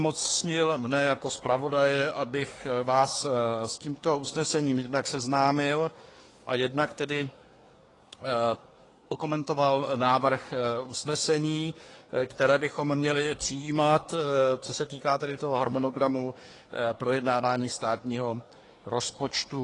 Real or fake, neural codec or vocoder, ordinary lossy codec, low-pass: fake; codec, 24 kHz, 1 kbps, SNAC; AAC, 32 kbps; 10.8 kHz